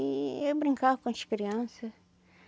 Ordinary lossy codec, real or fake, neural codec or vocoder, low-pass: none; real; none; none